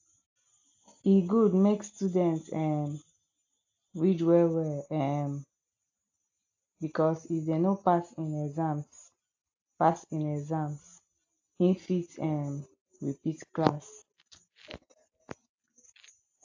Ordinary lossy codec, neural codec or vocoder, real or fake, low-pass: AAC, 48 kbps; none; real; 7.2 kHz